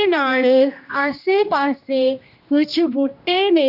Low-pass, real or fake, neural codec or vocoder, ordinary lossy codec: 5.4 kHz; fake; codec, 16 kHz, 1 kbps, X-Codec, HuBERT features, trained on general audio; none